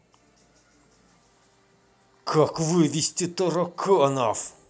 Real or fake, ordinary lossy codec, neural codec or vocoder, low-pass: real; none; none; none